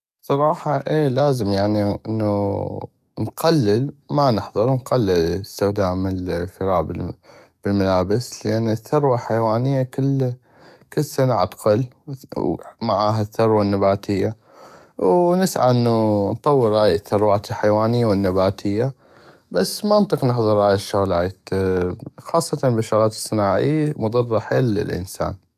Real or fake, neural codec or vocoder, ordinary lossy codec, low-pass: fake; codec, 44.1 kHz, 7.8 kbps, DAC; none; 14.4 kHz